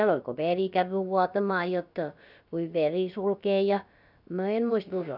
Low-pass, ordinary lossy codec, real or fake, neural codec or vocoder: 5.4 kHz; none; fake; codec, 16 kHz, about 1 kbps, DyCAST, with the encoder's durations